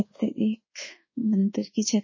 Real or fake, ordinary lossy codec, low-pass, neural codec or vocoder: fake; MP3, 32 kbps; 7.2 kHz; codec, 24 kHz, 1.2 kbps, DualCodec